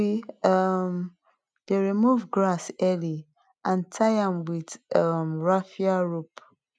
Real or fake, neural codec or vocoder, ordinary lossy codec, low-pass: real; none; none; none